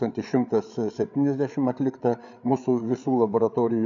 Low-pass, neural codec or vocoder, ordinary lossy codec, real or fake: 7.2 kHz; codec, 16 kHz, 8 kbps, FreqCodec, larger model; AAC, 64 kbps; fake